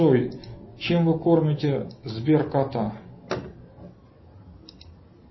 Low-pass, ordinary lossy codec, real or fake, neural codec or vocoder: 7.2 kHz; MP3, 24 kbps; real; none